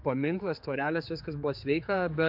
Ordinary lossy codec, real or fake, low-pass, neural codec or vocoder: MP3, 48 kbps; fake; 5.4 kHz; codec, 16 kHz, 4 kbps, X-Codec, HuBERT features, trained on general audio